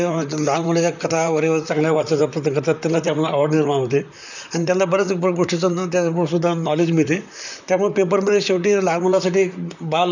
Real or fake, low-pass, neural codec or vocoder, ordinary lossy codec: fake; 7.2 kHz; vocoder, 44.1 kHz, 128 mel bands, Pupu-Vocoder; none